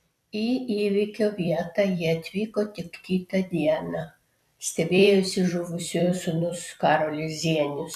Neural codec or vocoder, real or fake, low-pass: vocoder, 44.1 kHz, 128 mel bands every 256 samples, BigVGAN v2; fake; 14.4 kHz